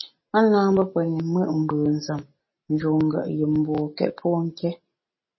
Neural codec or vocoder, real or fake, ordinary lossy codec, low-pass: none; real; MP3, 24 kbps; 7.2 kHz